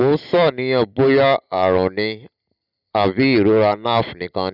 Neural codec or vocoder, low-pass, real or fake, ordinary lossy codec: none; 5.4 kHz; real; none